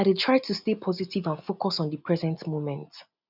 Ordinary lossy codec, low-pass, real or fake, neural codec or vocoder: none; 5.4 kHz; real; none